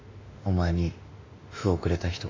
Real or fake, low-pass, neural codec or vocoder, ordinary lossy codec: fake; 7.2 kHz; autoencoder, 48 kHz, 32 numbers a frame, DAC-VAE, trained on Japanese speech; AAC, 32 kbps